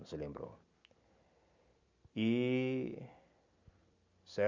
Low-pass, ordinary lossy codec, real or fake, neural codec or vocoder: 7.2 kHz; none; real; none